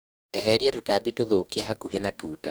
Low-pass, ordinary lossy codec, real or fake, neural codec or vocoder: none; none; fake; codec, 44.1 kHz, 2.6 kbps, DAC